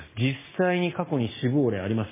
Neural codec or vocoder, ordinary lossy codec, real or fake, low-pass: none; MP3, 16 kbps; real; 3.6 kHz